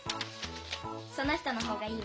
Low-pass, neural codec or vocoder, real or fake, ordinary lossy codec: none; none; real; none